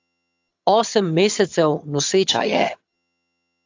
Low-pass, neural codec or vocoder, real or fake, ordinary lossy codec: 7.2 kHz; vocoder, 22.05 kHz, 80 mel bands, HiFi-GAN; fake; AAC, 48 kbps